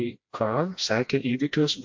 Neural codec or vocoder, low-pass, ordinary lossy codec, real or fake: codec, 16 kHz, 1 kbps, FreqCodec, smaller model; 7.2 kHz; MP3, 48 kbps; fake